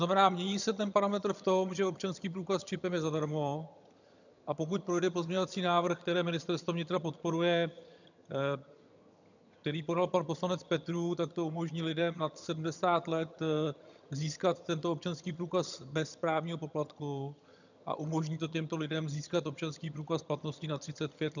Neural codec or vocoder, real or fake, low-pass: vocoder, 22.05 kHz, 80 mel bands, HiFi-GAN; fake; 7.2 kHz